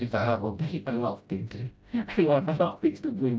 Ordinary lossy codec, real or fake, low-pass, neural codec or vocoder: none; fake; none; codec, 16 kHz, 0.5 kbps, FreqCodec, smaller model